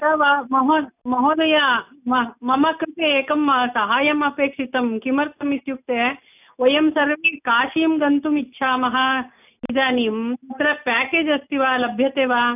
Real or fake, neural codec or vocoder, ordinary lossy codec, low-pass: real; none; none; 3.6 kHz